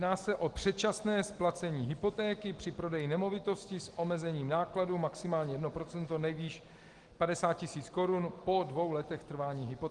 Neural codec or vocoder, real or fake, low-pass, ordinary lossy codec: none; real; 10.8 kHz; Opus, 24 kbps